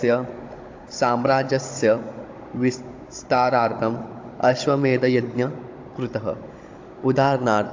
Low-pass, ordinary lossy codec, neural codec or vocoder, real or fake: 7.2 kHz; AAC, 48 kbps; codec, 16 kHz, 16 kbps, FunCodec, trained on Chinese and English, 50 frames a second; fake